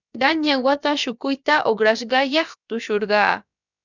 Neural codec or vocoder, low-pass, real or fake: codec, 16 kHz, about 1 kbps, DyCAST, with the encoder's durations; 7.2 kHz; fake